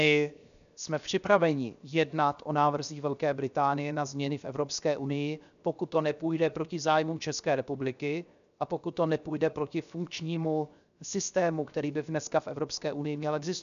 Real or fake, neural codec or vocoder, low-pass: fake; codec, 16 kHz, 0.7 kbps, FocalCodec; 7.2 kHz